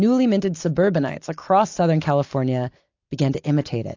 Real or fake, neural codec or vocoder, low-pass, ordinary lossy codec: real; none; 7.2 kHz; AAC, 48 kbps